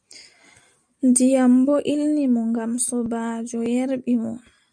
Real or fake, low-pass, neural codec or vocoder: real; 9.9 kHz; none